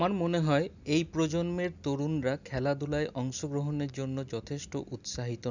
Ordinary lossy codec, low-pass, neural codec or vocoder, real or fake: none; 7.2 kHz; none; real